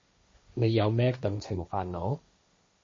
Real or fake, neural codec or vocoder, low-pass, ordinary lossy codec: fake; codec, 16 kHz, 1.1 kbps, Voila-Tokenizer; 7.2 kHz; MP3, 32 kbps